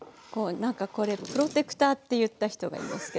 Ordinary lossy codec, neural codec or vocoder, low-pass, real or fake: none; none; none; real